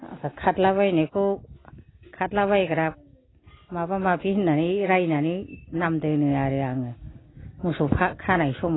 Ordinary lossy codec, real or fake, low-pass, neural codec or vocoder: AAC, 16 kbps; real; 7.2 kHz; none